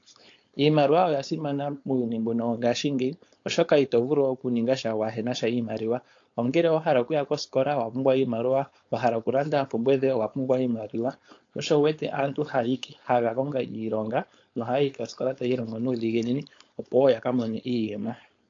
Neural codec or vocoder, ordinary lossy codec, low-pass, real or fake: codec, 16 kHz, 4.8 kbps, FACodec; AAC, 48 kbps; 7.2 kHz; fake